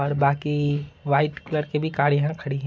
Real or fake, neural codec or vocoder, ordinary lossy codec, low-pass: real; none; none; none